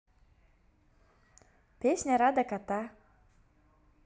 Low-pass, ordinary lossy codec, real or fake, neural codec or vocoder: none; none; real; none